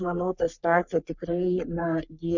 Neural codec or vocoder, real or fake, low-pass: codec, 44.1 kHz, 3.4 kbps, Pupu-Codec; fake; 7.2 kHz